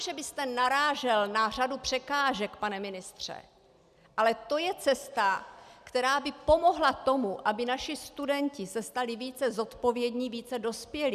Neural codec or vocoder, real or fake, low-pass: none; real; 14.4 kHz